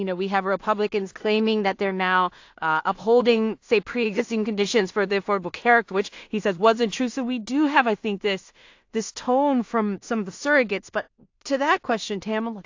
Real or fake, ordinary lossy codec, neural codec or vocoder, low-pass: fake; AAC, 48 kbps; codec, 16 kHz in and 24 kHz out, 0.4 kbps, LongCat-Audio-Codec, two codebook decoder; 7.2 kHz